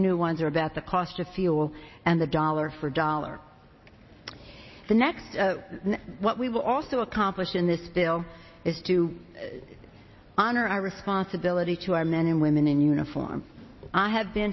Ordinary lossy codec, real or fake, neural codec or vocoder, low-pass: MP3, 24 kbps; real; none; 7.2 kHz